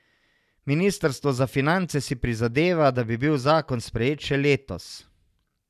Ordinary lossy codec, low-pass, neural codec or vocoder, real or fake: none; 14.4 kHz; none; real